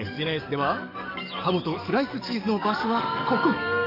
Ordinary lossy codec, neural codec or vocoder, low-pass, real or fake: none; codec, 44.1 kHz, 7.8 kbps, DAC; 5.4 kHz; fake